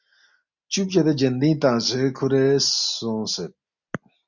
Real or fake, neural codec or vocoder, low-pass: real; none; 7.2 kHz